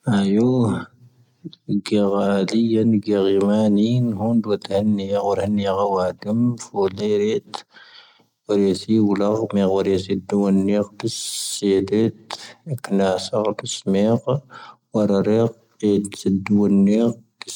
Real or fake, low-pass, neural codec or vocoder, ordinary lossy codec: real; 19.8 kHz; none; none